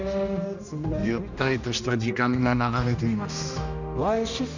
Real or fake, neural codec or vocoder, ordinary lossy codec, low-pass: fake; codec, 16 kHz, 1 kbps, X-Codec, HuBERT features, trained on general audio; none; 7.2 kHz